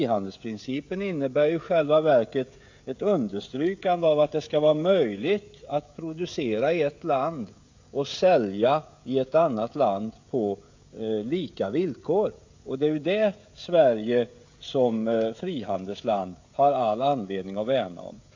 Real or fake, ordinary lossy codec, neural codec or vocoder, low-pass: fake; AAC, 48 kbps; codec, 16 kHz, 16 kbps, FreqCodec, smaller model; 7.2 kHz